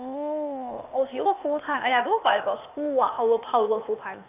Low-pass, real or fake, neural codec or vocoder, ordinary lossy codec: 3.6 kHz; fake; codec, 16 kHz, 0.8 kbps, ZipCodec; none